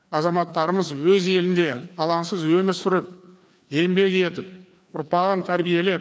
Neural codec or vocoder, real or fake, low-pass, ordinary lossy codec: codec, 16 kHz, 2 kbps, FreqCodec, larger model; fake; none; none